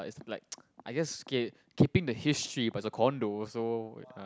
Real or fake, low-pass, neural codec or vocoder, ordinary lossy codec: real; none; none; none